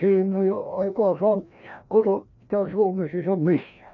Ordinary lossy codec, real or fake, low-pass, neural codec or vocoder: none; fake; 7.2 kHz; codec, 16 kHz, 1 kbps, FreqCodec, larger model